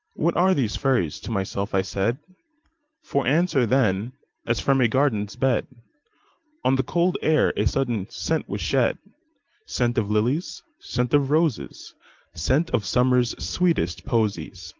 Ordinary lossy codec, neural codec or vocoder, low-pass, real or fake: Opus, 32 kbps; vocoder, 44.1 kHz, 128 mel bands every 512 samples, BigVGAN v2; 7.2 kHz; fake